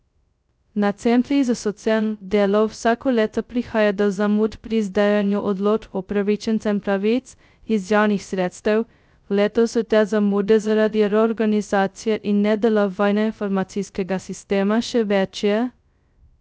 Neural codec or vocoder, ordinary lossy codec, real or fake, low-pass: codec, 16 kHz, 0.2 kbps, FocalCodec; none; fake; none